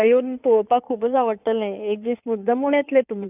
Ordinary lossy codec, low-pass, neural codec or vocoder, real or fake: none; 3.6 kHz; codec, 16 kHz in and 24 kHz out, 2.2 kbps, FireRedTTS-2 codec; fake